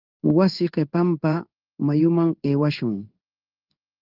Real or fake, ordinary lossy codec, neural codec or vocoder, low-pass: fake; Opus, 32 kbps; codec, 16 kHz in and 24 kHz out, 1 kbps, XY-Tokenizer; 5.4 kHz